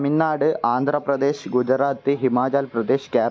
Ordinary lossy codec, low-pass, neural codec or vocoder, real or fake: none; none; none; real